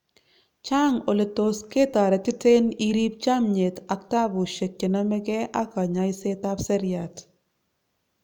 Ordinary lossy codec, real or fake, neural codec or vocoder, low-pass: none; real; none; 19.8 kHz